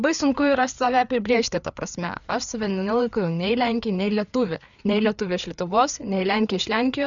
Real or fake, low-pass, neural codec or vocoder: fake; 7.2 kHz; codec, 16 kHz, 4 kbps, FreqCodec, larger model